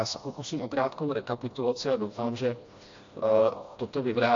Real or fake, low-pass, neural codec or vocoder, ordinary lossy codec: fake; 7.2 kHz; codec, 16 kHz, 1 kbps, FreqCodec, smaller model; AAC, 48 kbps